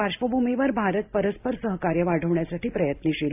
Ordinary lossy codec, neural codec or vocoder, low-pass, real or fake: AAC, 32 kbps; none; 3.6 kHz; real